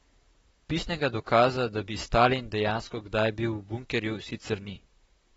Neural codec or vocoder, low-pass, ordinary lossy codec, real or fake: vocoder, 44.1 kHz, 128 mel bands, Pupu-Vocoder; 19.8 kHz; AAC, 24 kbps; fake